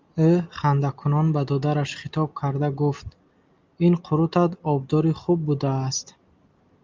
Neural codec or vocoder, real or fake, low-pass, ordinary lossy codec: none; real; 7.2 kHz; Opus, 24 kbps